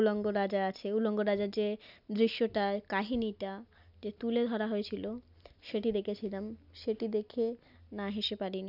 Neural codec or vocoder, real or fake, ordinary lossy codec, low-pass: none; real; none; 5.4 kHz